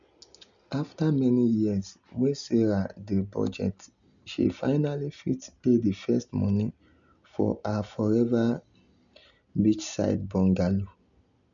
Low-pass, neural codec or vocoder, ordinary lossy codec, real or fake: 7.2 kHz; none; none; real